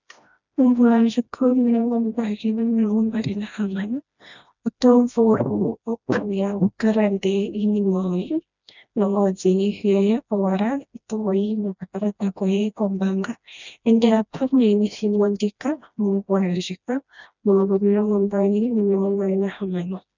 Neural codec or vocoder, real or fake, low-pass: codec, 16 kHz, 1 kbps, FreqCodec, smaller model; fake; 7.2 kHz